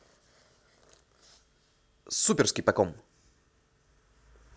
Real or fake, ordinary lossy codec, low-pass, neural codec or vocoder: real; none; none; none